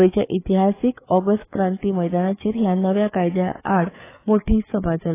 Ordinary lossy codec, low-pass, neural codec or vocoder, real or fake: AAC, 16 kbps; 3.6 kHz; codec, 16 kHz, 4 kbps, FreqCodec, larger model; fake